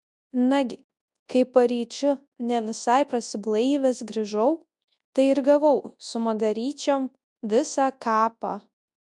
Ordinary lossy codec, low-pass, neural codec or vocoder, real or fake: MP3, 96 kbps; 10.8 kHz; codec, 24 kHz, 0.9 kbps, WavTokenizer, large speech release; fake